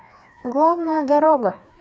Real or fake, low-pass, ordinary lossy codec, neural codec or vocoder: fake; none; none; codec, 16 kHz, 2 kbps, FreqCodec, larger model